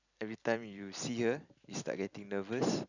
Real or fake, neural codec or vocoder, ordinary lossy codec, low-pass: fake; vocoder, 44.1 kHz, 128 mel bands every 256 samples, BigVGAN v2; none; 7.2 kHz